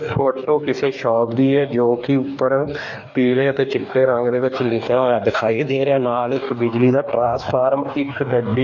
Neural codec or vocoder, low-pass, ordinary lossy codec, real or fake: codec, 44.1 kHz, 2.6 kbps, DAC; 7.2 kHz; none; fake